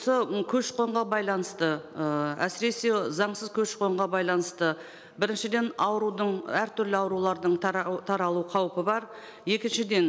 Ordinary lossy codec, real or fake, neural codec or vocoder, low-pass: none; real; none; none